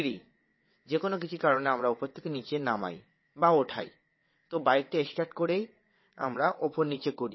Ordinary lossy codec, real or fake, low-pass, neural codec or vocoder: MP3, 24 kbps; fake; 7.2 kHz; vocoder, 22.05 kHz, 80 mel bands, WaveNeXt